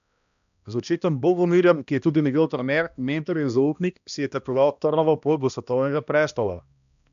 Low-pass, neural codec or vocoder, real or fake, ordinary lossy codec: 7.2 kHz; codec, 16 kHz, 1 kbps, X-Codec, HuBERT features, trained on balanced general audio; fake; none